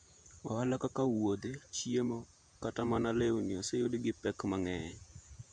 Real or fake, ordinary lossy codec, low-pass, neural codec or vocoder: fake; none; 9.9 kHz; vocoder, 48 kHz, 128 mel bands, Vocos